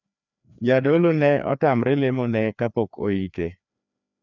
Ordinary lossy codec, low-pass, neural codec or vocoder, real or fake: none; 7.2 kHz; codec, 16 kHz, 2 kbps, FreqCodec, larger model; fake